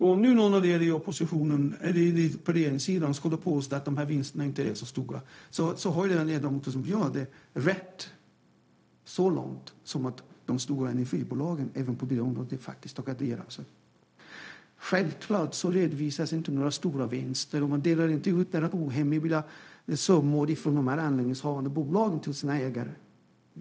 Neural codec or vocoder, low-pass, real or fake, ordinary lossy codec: codec, 16 kHz, 0.4 kbps, LongCat-Audio-Codec; none; fake; none